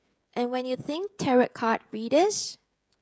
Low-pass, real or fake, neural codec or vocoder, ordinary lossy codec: none; fake; codec, 16 kHz, 16 kbps, FreqCodec, smaller model; none